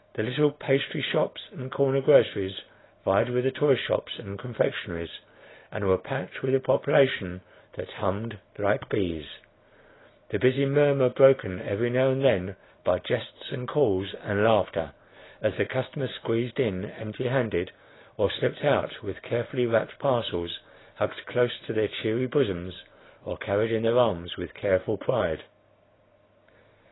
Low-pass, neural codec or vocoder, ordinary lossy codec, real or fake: 7.2 kHz; none; AAC, 16 kbps; real